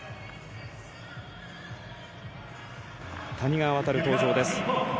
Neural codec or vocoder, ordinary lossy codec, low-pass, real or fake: none; none; none; real